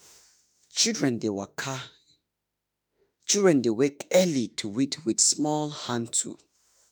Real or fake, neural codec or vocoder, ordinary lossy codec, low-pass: fake; autoencoder, 48 kHz, 32 numbers a frame, DAC-VAE, trained on Japanese speech; none; none